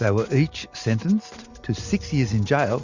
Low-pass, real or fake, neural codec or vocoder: 7.2 kHz; real; none